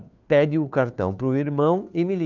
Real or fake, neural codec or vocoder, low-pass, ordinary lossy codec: fake; codec, 16 kHz, 2 kbps, FunCodec, trained on Chinese and English, 25 frames a second; 7.2 kHz; none